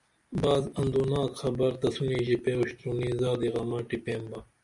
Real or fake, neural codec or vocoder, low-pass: real; none; 10.8 kHz